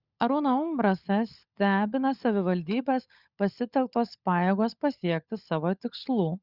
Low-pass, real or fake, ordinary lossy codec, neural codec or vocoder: 5.4 kHz; fake; Opus, 64 kbps; codec, 16 kHz, 16 kbps, FunCodec, trained on LibriTTS, 50 frames a second